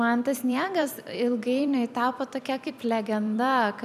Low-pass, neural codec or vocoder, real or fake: 14.4 kHz; vocoder, 44.1 kHz, 128 mel bands every 256 samples, BigVGAN v2; fake